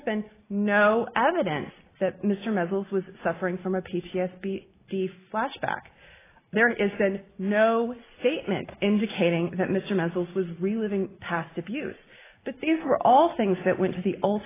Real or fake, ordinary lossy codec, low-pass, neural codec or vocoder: real; AAC, 16 kbps; 3.6 kHz; none